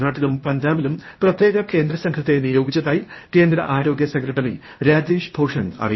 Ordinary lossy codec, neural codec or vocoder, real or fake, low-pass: MP3, 24 kbps; codec, 16 kHz, 0.8 kbps, ZipCodec; fake; 7.2 kHz